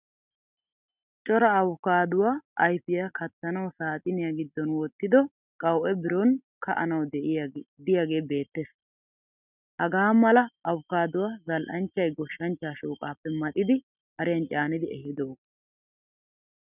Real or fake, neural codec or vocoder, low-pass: real; none; 3.6 kHz